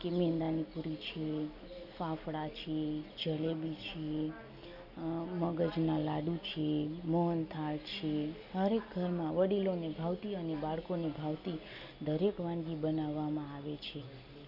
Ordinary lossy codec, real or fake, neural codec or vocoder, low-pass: none; real; none; 5.4 kHz